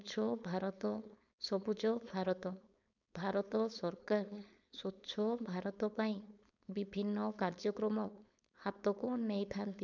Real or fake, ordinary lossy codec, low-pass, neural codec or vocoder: fake; none; 7.2 kHz; codec, 16 kHz, 4.8 kbps, FACodec